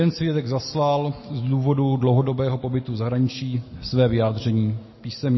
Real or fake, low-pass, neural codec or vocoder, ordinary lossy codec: real; 7.2 kHz; none; MP3, 24 kbps